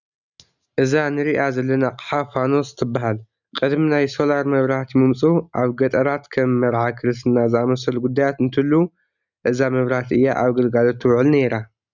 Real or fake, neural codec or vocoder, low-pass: real; none; 7.2 kHz